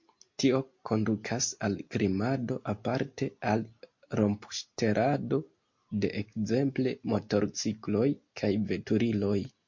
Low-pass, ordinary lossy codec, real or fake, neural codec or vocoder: 7.2 kHz; MP3, 48 kbps; real; none